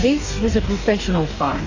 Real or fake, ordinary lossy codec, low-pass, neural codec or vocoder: fake; AAC, 48 kbps; 7.2 kHz; codec, 44.1 kHz, 2.6 kbps, DAC